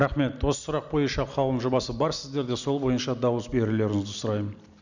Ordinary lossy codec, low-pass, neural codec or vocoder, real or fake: none; 7.2 kHz; none; real